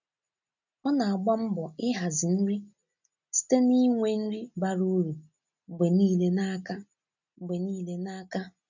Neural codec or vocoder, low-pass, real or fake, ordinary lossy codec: none; 7.2 kHz; real; none